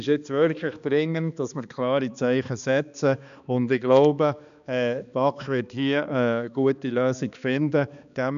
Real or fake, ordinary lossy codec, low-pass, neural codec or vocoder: fake; none; 7.2 kHz; codec, 16 kHz, 2 kbps, X-Codec, HuBERT features, trained on balanced general audio